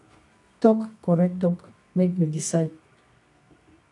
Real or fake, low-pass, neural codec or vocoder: fake; 10.8 kHz; codec, 24 kHz, 0.9 kbps, WavTokenizer, medium music audio release